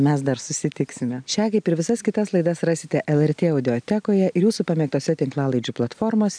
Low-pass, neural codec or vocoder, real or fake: 9.9 kHz; none; real